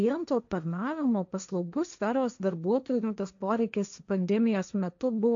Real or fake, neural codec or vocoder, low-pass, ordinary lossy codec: fake; codec, 16 kHz, 1.1 kbps, Voila-Tokenizer; 7.2 kHz; MP3, 64 kbps